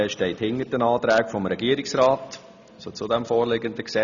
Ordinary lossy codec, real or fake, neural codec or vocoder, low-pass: none; real; none; 7.2 kHz